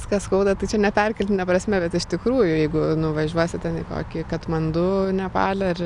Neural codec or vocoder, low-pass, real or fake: none; 10.8 kHz; real